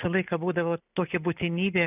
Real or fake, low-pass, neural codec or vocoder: real; 3.6 kHz; none